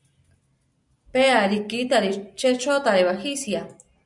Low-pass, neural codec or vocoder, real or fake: 10.8 kHz; none; real